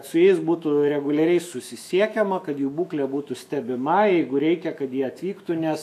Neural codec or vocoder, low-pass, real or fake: autoencoder, 48 kHz, 128 numbers a frame, DAC-VAE, trained on Japanese speech; 14.4 kHz; fake